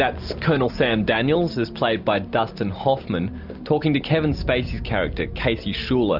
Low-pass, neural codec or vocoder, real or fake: 5.4 kHz; none; real